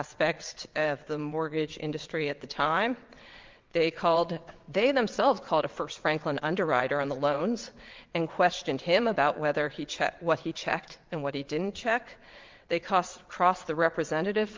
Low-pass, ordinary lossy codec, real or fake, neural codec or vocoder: 7.2 kHz; Opus, 32 kbps; fake; vocoder, 22.05 kHz, 80 mel bands, WaveNeXt